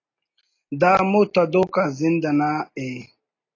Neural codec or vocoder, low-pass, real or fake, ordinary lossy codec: none; 7.2 kHz; real; AAC, 32 kbps